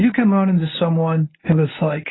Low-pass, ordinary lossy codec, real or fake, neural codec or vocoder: 7.2 kHz; AAC, 16 kbps; fake; codec, 24 kHz, 0.9 kbps, WavTokenizer, medium speech release version 1